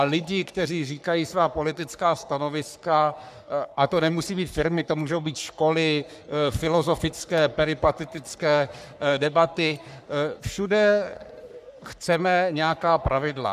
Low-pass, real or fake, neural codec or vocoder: 14.4 kHz; fake; codec, 44.1 kHz, 3.4 kbps, Pupu-Codec